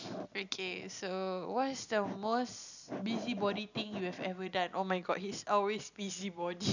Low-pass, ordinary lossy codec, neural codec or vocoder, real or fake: 7.2 kHz; none; none; real